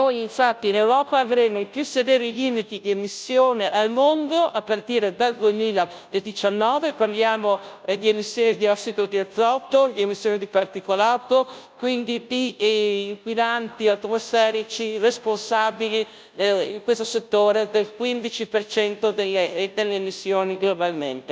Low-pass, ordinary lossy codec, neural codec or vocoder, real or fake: none; none; codec, 16 kHz, 0.5 kbps, FunCodec, trained on Chinese and English, 25 frames a second; fake